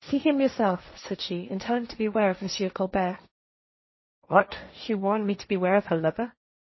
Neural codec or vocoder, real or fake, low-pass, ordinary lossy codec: codec, 16 kHz, 1.1 kbps, Voila-Tokenizer; fake; 7.2 kHz; MP3, 24 kbps